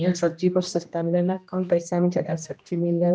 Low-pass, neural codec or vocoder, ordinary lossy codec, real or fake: none; codec, 16 kHz, 1 kbps, X-Codec, HuBERT features, trained on general audio; none; fake